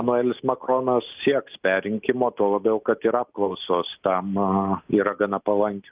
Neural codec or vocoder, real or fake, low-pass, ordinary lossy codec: none; real; 3.6 kHz; Opus, 24 kbps